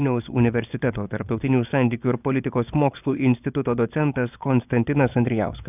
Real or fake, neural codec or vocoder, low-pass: fake; vocoder, 24 kHz, 100 mel bands, Vocos; 3.6 kHz